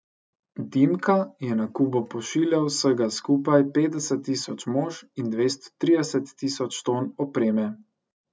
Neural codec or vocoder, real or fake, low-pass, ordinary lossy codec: none; real; none; none